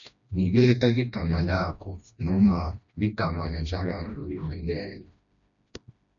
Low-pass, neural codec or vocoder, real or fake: 7.2 kHz; codec, 16 kHz, 1 kbps, FreqCodec, smaller model; fake